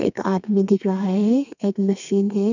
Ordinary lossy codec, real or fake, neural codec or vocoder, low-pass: none; fake; codec, 32 kHz, 1.9 kbps, SNAC; 7.2 kHz